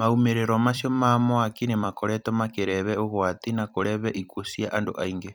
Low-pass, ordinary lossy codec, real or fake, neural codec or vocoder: none; none; fake; vocoder, 44.1 kHz, 128 mel bands every 256 samples, BigVGAN v2